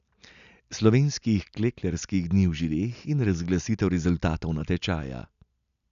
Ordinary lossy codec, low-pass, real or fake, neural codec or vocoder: none; 7.2 kHz; real; none